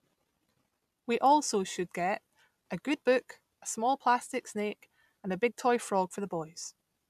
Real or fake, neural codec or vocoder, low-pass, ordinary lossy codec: real; none; 14.4 kHz; none